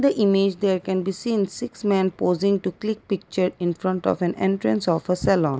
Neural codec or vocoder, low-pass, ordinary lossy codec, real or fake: none; none; none; real